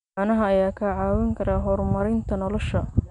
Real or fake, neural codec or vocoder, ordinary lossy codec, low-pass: real; none; none; 10.8 kHz